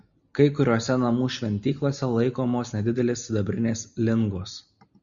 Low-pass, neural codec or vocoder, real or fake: 7.2 kHz; none; real